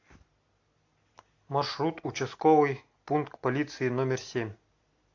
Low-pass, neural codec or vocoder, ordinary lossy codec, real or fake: 7.2 kHz; none; AAC, 48 kbps; real